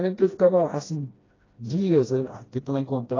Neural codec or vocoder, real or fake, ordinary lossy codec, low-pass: codec, 16 kHz, 1 kbps, FreqCodec, smaller model; fake; AAC, 32 kbps; 7.2 kHz